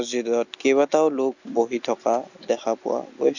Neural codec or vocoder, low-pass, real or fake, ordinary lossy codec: none; 7.2 kHz; real; none